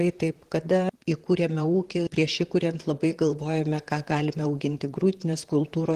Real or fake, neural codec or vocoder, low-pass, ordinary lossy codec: fake; vocoder, 44.1 kHz, 128 mel bands, Pupu-Vocoder; 14.4 kHz; Opus, 16 kbps